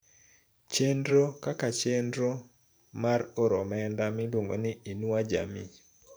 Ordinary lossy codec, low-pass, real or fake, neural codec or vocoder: none; none; fake; vocoder, 44.1 kHz, 128 mel bands every 512 samples, BigVGAN v2